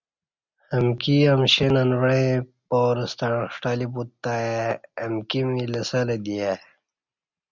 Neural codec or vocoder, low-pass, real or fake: none; 7.2 kHz; real